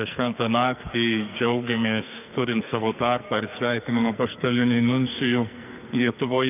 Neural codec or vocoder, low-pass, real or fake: codec, 44.1 kHz, 2.6 kbps, SNAC; 3.6 kHz; fake